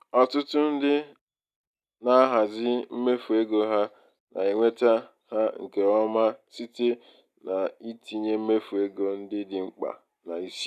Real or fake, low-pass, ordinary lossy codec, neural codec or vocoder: real; 14.4 kHz; none; none